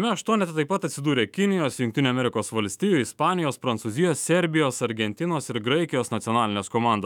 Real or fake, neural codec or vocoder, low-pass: fake; autoencoder, 48 kHz, 128 numbers a frame, DAC-VAE, trained on Japanese speech; 19.8 kHz